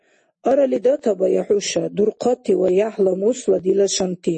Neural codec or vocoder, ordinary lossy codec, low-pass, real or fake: none; MP3, 32 kbps; 10.8 kHz; real